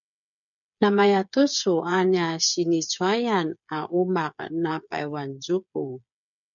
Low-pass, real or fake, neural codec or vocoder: 7.2 kHz; fake; codec, 16 kHz, 8 kbps, FreqCodec, smaller model